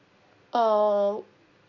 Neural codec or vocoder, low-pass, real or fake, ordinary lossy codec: none; 7.2 kHz; real; none